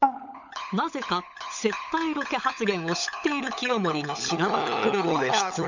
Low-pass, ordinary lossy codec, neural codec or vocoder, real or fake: 7.2 kHz; none; codec, 16 kHz, 16 kbps, FunCodec, trained on LibriTTS, 50 frames a second; fake